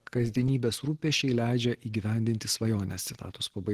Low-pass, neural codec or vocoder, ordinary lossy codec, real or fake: 14.4 kHz; none; Opus, 16 kbps; real